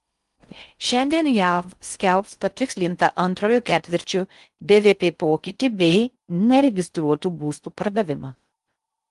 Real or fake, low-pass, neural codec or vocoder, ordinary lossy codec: fake; 10.8 kHz; codec, 16 kHz in and 24 kHz out, 0.6 kbps, FocalCodec, streaming, 2048 codes; Opus, 32 kbps